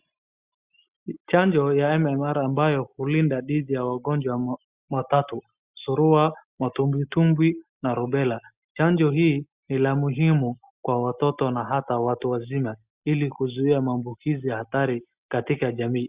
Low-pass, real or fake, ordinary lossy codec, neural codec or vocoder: 3.6 kHz; real; Opus, 64 kbps; none